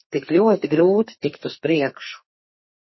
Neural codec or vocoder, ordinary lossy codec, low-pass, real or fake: codec, 44.1 kHz, 2.6 kbps, SNAC; MP3, 24 kbps; 7.2 kHz; fake